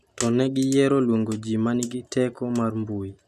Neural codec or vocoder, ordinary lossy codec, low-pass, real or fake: none; none; 14.4 kHz; real